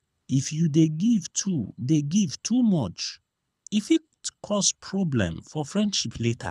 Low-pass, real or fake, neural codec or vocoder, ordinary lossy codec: none; fake; codec, 24 kHz, 6 kbps, HILCodec; none